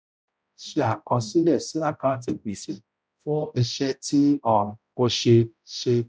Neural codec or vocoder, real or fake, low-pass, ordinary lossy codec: codec, 16 kHz, 0.5 kbps, X-Codec, HuBERT features, trained on balanced general audio; fake; none; none